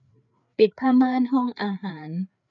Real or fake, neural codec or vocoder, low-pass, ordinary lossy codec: fake; codec, 16 kHz, 4 kbps, FreqCodec, larger model; 7.2 kHz; none